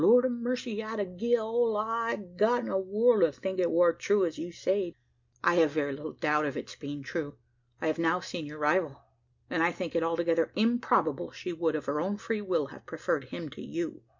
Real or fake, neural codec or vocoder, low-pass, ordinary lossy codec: real; none; 7.2 kHz; MP3, 64 kbps